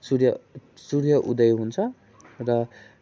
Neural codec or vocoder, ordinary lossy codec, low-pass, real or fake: none; none; none; real